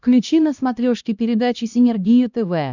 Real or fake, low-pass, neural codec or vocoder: fake; 7.2 kHz; codec, 16 kHz, 1 kbps, X-Codec, HuBERT features, trained on LibriSpeech